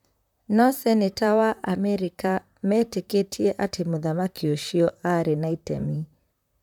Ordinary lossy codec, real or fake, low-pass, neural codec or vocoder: none; fake; 19.8 kHz; vocoder, 44.1 kHz, 128 mel bands, Pupu-Vocoder